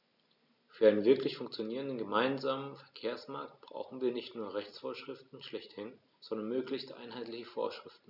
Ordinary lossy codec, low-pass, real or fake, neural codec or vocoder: none; 5.4 kHz; real; none